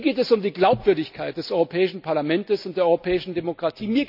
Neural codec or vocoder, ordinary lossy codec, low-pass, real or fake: none; none; 5.4 kHz; real